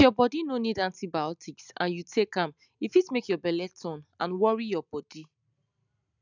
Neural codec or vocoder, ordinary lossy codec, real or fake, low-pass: none; none; real; 7.2 kHz